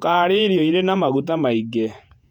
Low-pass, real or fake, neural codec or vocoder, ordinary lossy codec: 19.8 kHz; fake; vocoder, 48 kHz, 128 mel bands, Vocos; none